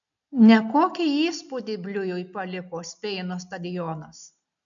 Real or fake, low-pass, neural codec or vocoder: real; 7.2 kHz; none